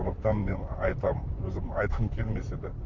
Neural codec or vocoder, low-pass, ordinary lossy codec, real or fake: vocoder, 44.1 kHz, 128 mel bands, Pupu-Vocoder; 7.2 kHz; none; fake